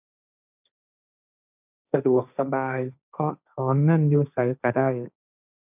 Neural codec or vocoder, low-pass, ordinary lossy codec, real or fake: codec, 16 kHz, 1.1 kbps, Voila-Tokenizer; 3.6 kHz; none; fake